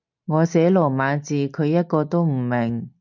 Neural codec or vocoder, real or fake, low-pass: none; real; 7.2 kHz